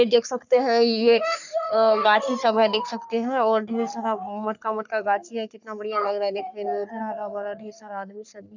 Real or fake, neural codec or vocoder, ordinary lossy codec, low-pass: fake; codec, 44.1 kHz, 3.4 kbps, Pupu-Codec; none; 7.2 kHz